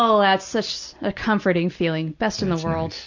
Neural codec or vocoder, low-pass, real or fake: none; 7.2 kHz; real